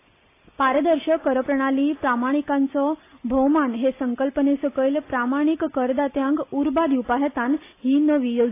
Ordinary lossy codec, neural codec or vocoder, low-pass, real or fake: AAC, 24 kbps; none; 3.6 kHz; real